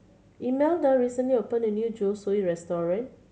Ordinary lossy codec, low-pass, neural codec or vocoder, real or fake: none; none; none; real